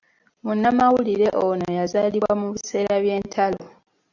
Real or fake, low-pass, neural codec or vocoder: real; 7.2 kHz; none